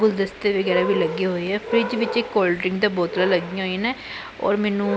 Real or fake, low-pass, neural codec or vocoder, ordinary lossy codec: real; none; none; none